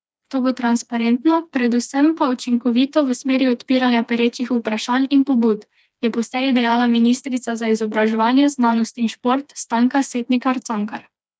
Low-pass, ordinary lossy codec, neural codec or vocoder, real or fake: none; none; codec, 16 kHz, 2 kbps, FreqCodec, smaller model; fake